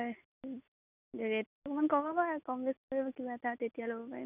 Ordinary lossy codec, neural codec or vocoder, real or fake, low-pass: none; none; real; 3.6 kHz